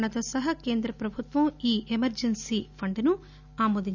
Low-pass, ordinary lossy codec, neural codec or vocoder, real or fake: 7.2 kHz; none; none; real